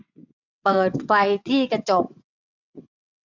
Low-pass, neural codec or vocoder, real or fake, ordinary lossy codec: 7.2 kHz; vocoder, 22.05 kHz, 80 mel bands, WaveNeXt; fake; none